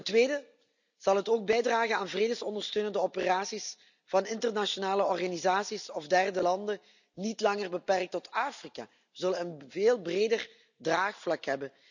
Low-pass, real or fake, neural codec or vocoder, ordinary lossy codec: 7.2 kHz; real; none; none